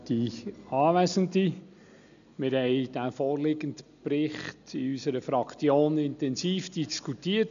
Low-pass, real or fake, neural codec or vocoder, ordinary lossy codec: 7.2 kHz; real; none; none